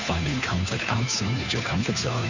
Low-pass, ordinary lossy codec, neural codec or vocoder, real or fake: 7.2 kHz; Opus, 64 kbps; codec, 16 kHz, 2 kbps, FunCodec, trained on Chinese and English, 25 frames a second; fake